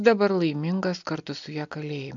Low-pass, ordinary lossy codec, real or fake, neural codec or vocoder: 7.2 kHz; MP3, 64 kbps; real; none